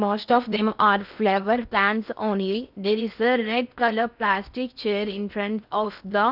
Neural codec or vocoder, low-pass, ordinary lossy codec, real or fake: codec, 16 kHz in and 24 kHz out, 0.6 kbps, FocalCodec, streaming, 4096 codes; 5.4 kHz; none; fake